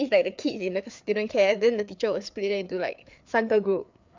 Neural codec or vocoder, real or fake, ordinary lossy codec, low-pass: codec, 16 kHz, 4 kbps, FreqCodec, larger model; fake; MP3, 64 kbps; 7.2 kHz